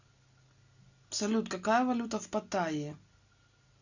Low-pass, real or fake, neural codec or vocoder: 7.2 kHz; real; none